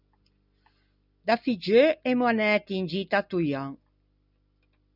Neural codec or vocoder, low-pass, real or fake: none; 5.4 kHz; real